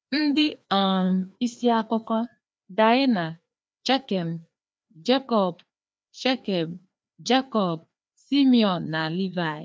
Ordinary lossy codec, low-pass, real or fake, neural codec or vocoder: none; none; fake; codec, 16 kHz, 2 kbps, FreqCodec, larger model